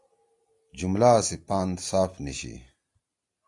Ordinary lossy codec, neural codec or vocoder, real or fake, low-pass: AAC, 64 kbps; none; real; 10.8 kHz